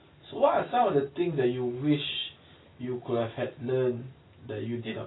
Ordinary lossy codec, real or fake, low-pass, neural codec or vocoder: AAC, 16 kbps; real; 7.2 kHz; none